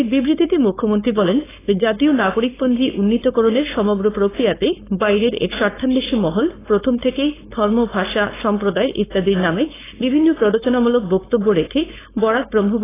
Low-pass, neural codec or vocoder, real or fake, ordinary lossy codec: 3.6 kHz; codec, 16 kHz, 4.8 kbps, FACodec; fake; AAC, 16 kbps